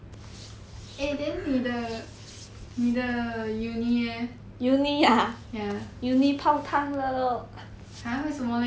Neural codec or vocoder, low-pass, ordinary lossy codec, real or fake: none; none; none; real